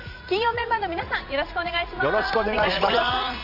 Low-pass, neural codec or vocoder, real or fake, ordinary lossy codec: 5.4 kHz; vocoder, 44.1 kHz, 80 mel bands, Vocos; fake; none